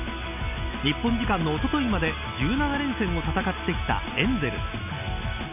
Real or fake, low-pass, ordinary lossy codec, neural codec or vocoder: real; 3.6 kHz; none; none